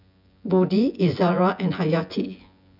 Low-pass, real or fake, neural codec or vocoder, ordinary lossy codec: 5.4 kHz; fake; vocoder, 24 kHz, 100 mel bands, Vocos; none